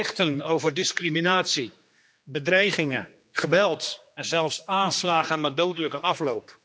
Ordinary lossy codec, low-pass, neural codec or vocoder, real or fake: none; none; codec, 16 kHz, 2 kbps, X-Codec, HuBERT features, trained on general audio; fake